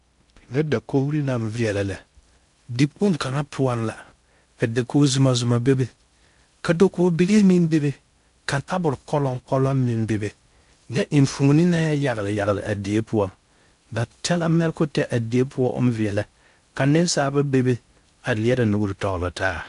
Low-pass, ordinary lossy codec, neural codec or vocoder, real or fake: 10.8 kHz; AAC, 96 kbps; codec, 16 kHz in and 24 kHz out, 0.6 kbps, FocalCodec, streaming, 2048 codes; fake